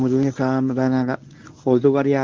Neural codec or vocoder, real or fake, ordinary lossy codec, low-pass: codec, 24 kHz, 0.9 kbps, WavTokenizer, medium speech release version 1; fake; Opus, 24 kbps; 7.2 kHz